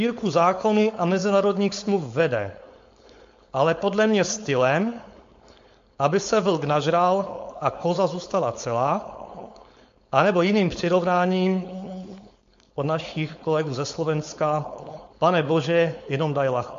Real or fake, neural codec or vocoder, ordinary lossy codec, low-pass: fake; codec, 16 kHz, 4.8 kbps, FACodec; MP3, 48 kbps; 7.2 kHz